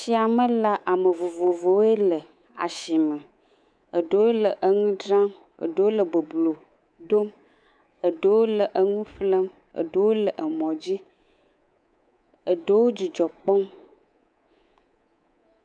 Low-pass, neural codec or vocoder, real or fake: 9.9 kHz; codec, 24 kHz, 3.1 kbps, DualCodec; fake